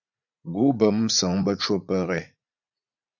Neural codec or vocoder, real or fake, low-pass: vocoder, 44.1 kHz, 80 mel bands, Vocos; fake; 7.2 kHz